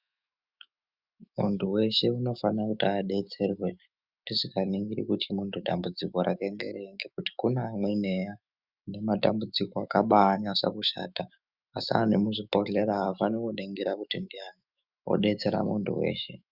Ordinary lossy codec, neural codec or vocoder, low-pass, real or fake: Opus, 64 kbps; autoencoder, 48 kHz, 128 numbers a frame, DAC-VAE, trained on Japanese speech; 5.4 kHz; fake